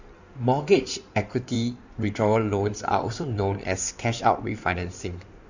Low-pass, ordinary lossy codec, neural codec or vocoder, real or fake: 7.2 kHz; none; codec, 16 kHz in and 24 kHz out, 2.2 kbps, FireRedTTS-2 codec; fake